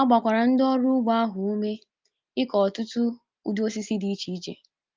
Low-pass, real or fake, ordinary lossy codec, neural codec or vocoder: 7.2 kHz; real; Opus, 24 kbps; none